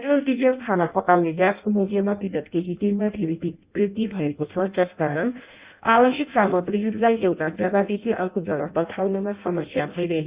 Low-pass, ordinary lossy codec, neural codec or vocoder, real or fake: 3.6 kHz; Opus, 64 kbps; codec, 16 kHz in and 24 kHz out, 0.6 kbps, FireRedTTS-2 codec; fake